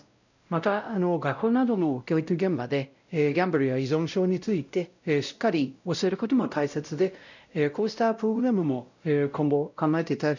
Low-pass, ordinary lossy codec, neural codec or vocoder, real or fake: 7.2 kHz; none; codec, 16 kHz, 0.5 kbps, X-Codec, WavLM features, trained on Multilingual LibriSpeech; fake